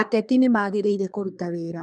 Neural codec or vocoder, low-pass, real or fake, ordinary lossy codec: codec, 24 kHz, 1 kbps, SNAC; 9.9 kHz; fake; none